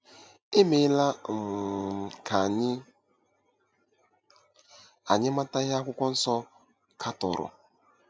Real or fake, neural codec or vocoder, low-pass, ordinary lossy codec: real; none; none; none